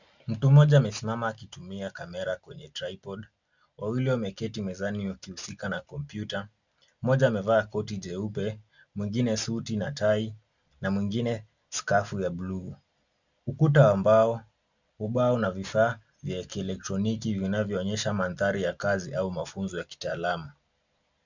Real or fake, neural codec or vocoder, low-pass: real; none; 7.2 kHz